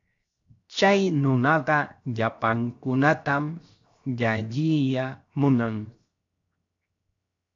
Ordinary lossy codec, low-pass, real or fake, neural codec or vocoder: AAC, 48 kbps; 7.2 kHz; fake; codec, 16 kHz, 0.7 kbps, FocalCodec